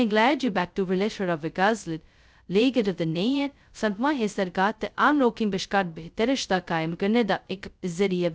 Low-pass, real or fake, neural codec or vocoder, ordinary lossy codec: none; fake; codec, 16 kHz, 0.2 kbps, FocalCodec; none